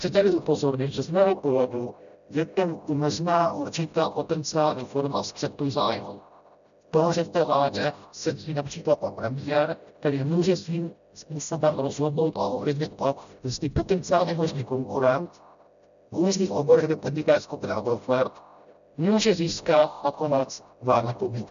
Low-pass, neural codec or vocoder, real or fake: 7.2 kHz; codec, 16 kHz, 0.5 kbps, FreqCodec, smaller model; fake